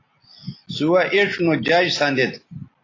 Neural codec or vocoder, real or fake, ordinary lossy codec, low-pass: none; real; AAC, 32 kbps; 7.2 kHz